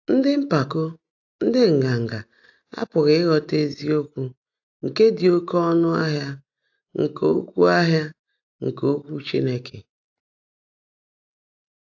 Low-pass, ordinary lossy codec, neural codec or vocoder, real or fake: 7.2 kHz; AAC, 48 kbps; none; real